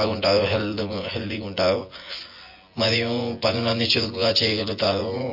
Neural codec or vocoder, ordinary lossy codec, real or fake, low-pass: vocoder, 24 kHz, 100 mel bands, Vocos; MP3, 32 kbps; fake; 5.4 kHz